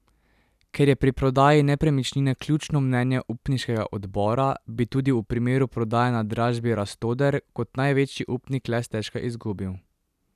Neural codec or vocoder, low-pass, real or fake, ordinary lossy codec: none; 14.4 kHz; real; none